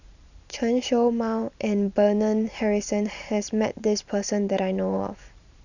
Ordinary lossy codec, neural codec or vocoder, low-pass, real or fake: none; vocoder, 44.1 kHz, 128 mel bands every 512 samples, BigVGAN v2; 7.2 kHz; fake